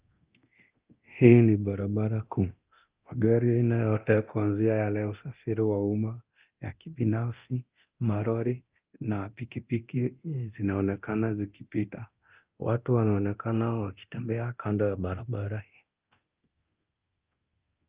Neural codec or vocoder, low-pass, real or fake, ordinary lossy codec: codec, 24 kHz, 0.9 kbps, DualCodec; 3.6 kHz; fake; Opus, 32 kbps